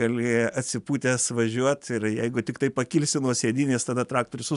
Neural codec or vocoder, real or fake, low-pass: none; real; 10.8 kHz